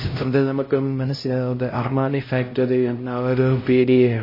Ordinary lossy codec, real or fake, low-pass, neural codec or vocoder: MP3, 24 kbps; fake; 5.4 kHz; codec, 16 kHz, 0.5 kbps, X-Codec, HuBERT features, trained on LibriSpeech